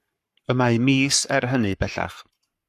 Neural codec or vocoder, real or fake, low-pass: codec, 44.1 kHz, 7.8 kbps, Pupu-Codec; fake; 14.4 kHz